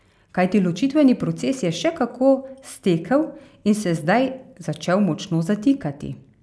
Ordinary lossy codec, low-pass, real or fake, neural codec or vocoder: none; none; real; none